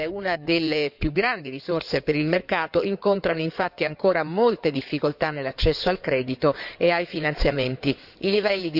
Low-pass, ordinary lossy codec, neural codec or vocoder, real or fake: 5.4 kHz; none; codec, 16 kHz in and 24 kHz out, 2.2 kbps, FireRedTTS-2 codec; fake